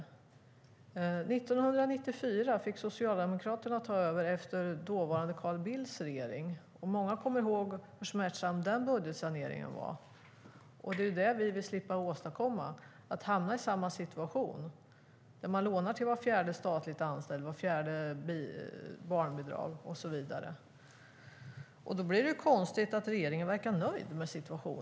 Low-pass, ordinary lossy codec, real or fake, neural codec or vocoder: none; none; real; none